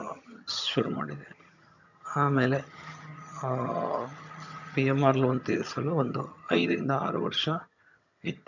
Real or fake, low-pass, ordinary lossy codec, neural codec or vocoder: fake; 7.2 kHz; none; vocoder, 22.05 kHz, 80 mel bands, HiFi-GAN